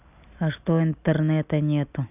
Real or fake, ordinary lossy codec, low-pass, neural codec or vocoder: real; none; 3.6 kHz; none